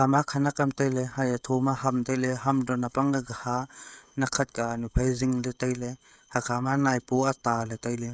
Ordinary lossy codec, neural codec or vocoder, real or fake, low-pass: none; codec, 16 kHz, 16 kbps, FreqCodec, smaller model; fake; none